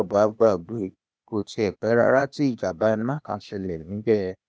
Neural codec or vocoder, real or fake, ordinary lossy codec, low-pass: codec, 16 kHz, 0.8 kbps, ZipCodec; fake; none; none